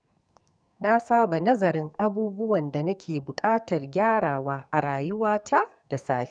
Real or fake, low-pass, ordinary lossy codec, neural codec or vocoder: fake; 9.9 kHz; none; codec, 44.1 kHz, 2.6 kbps, SNAC